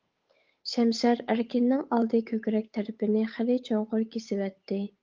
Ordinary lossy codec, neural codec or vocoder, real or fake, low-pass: Opus, 32 kbps; codec, 16 kHz, 8 kbps, FunCodec, trained on Chinese and English, 25 frames a second; fake; 7.2 kHz